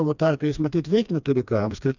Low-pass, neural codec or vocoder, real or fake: 7.2 kHz; codec, 16 kHz, 2 kbps, FreqCodec, smaller model; fake